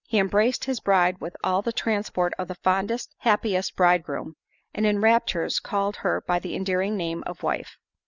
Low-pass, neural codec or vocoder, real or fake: 7.2 kHz; none; real